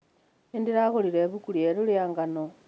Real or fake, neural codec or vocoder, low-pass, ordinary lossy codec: real; none; none; none